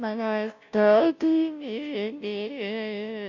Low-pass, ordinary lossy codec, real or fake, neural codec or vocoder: 7.2 kHz; none; fake; codec, 16 kHz, 0.5 kbps, FunCodec, trained on Chinese and English, 25 frames a second